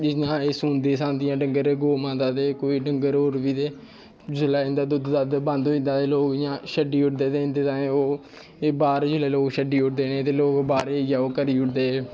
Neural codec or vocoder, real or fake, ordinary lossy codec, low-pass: none; real; none; none